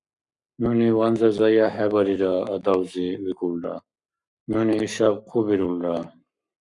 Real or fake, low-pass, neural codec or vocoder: fake; 10.8 kHz; codec, 44.1 kHz, 7.8 kbps, Pupu-Codec